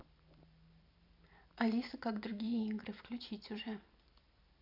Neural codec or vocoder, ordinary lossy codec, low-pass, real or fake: vocoder, 44.1 kHz, 128 mel bands every 256 samples, BigVGAN v2; none; 5.4 kHz; fake